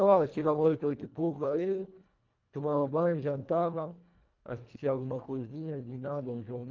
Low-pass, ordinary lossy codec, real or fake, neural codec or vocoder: 7.2 kHz; Opus, 32 kbps; fake; codec, 24 kHz, 1.5 kbps, HILCodec